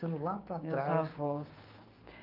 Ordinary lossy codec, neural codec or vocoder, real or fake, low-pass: Opus, 24 kbps; codec, 16 kHz, 6 kbps, DAC; fake; 5.4 kHz